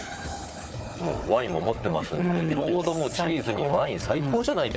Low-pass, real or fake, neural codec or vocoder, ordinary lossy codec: none; fake; codec, 16 kHz, 4 kbps, FunCodec, trained on Chinese and English, 50 frames a second; none